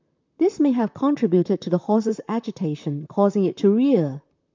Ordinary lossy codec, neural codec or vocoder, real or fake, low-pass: MP3, 64 kbps; vocoder, 44.1 kHz, 128 mel bands, Pupu-Vocoder; fake; 7.2 kHz